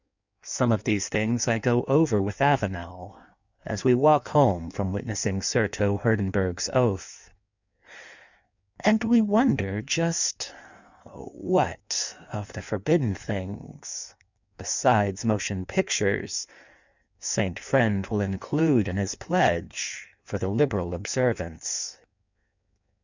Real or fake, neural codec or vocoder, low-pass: fake; codec, 16 kHz in and 24 kHz out, 1.1 kbps, FireRedTTS-2 codec; 7.2 kHz